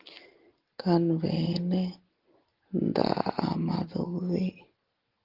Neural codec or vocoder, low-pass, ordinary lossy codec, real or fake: none; 5.4 kHz; Opus, 16 kbps; real